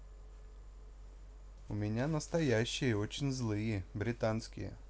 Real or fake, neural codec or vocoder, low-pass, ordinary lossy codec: real; none; none; none